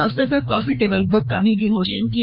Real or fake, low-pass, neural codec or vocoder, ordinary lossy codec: fake; 5.4 kHz; codec, 16 kHz, 1 kbps, FreqCodec, larger model; none